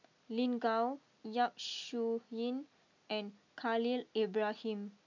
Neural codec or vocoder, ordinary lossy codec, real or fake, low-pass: none; MP3, 64 kbps; real; 7.2 kHz